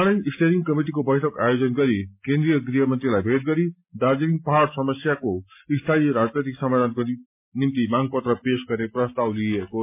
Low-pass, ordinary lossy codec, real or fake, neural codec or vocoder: 3.6 kHz; MP3, 32 kbps; real; none